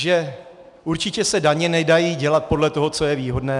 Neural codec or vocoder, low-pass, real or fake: none; 10.8 kHz; real